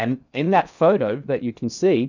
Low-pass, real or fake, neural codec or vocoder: 7.2 kHz; fake; codec, 16 kHz in and 24 kHz out, 0.8 kbps, FocalCodec, streaming, 65536 codes